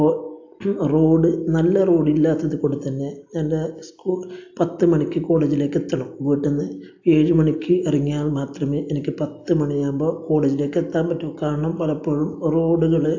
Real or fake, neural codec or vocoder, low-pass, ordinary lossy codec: real; none; 7.2 kHz; AAC, 48 kbps